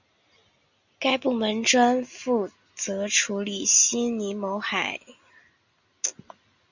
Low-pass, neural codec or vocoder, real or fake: 7.2 kHz; none; real